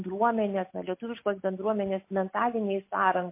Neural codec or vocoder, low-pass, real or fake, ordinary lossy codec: none; 3.6 kHz; real; MP3, 24 kbps